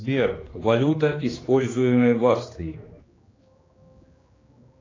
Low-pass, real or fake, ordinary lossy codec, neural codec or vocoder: 7.2 kHz; fake; AAC, 32 kbps; codec, 16 kHz, 2 kbps, X-Codec, HuBERT features, trained on general audio